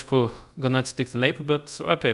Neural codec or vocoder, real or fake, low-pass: codec, 24 kHz, 0.5 kbps, DualCodec; fake; 10.8 kHz